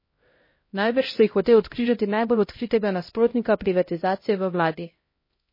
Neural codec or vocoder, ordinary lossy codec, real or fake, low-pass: codec, 16 kHz, 0.5 kbps, X-Codec, HuBERT features, trained on LibriSpeech; MP3, 24 kbps; fake; 5.4 kHz